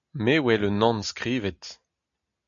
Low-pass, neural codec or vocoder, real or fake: 7.2 kHz; none; real